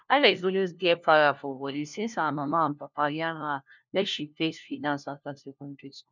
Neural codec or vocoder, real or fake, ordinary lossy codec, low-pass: codec, 16 kHz, 1 kbps, FunCodec, trained on LibriTTS, 50 frames a second; fake; none; 7.2 kHz